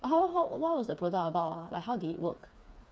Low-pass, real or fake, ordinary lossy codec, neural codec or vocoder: none; fake; none; codec, 16 kHz, 4 kbps, FreqCodec, smaller model